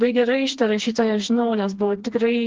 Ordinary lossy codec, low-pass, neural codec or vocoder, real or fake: Opus, 16 kbps; 7.2 kHz; codec, 16 kHz, 2 kbps, FreqCodec, smaller model; fake